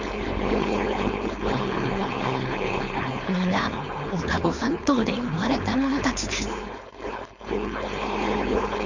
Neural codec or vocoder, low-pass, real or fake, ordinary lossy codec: codec, 16 kHz, 4.8 kbps, FACodec; 7.2 kHz; fake; none